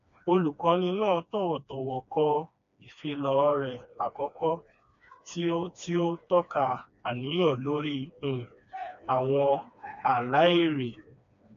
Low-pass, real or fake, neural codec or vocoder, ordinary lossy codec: 7.2 kHz; fake; codec, 16 kHz, 2 kbps, FreqCodec, smaller model; none